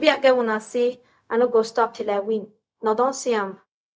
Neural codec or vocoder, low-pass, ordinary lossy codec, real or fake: codec, 16 kHz, 0.4 kbps, LongCat-Audio-Codec; none; none; fake